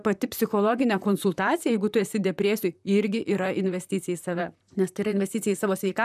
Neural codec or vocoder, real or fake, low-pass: vocoder, 44.1 kHz, 128 mel bands, Pupu-Vocoder; fake; 14.4 kHz